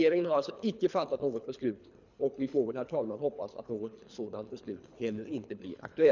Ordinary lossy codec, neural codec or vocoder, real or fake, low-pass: none; codec, 24 kHz, 3 kbps, HILCodec; fake; 7.2 kHz